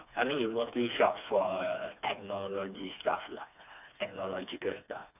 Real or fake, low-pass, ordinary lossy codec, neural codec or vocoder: fake; 3.6 kHz; none; codec, 16 kHz, 2 kbps, FreqCodec, smaller model